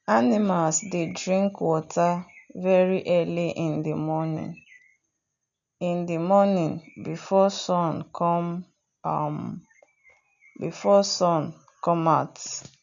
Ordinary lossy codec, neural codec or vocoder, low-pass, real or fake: none; none; 7.2 kHz; real